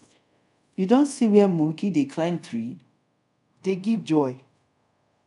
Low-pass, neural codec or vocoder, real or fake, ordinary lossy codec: 10.8 kHz; codec, 24 kHz, 0.5 kbps, DualCodec; fake; none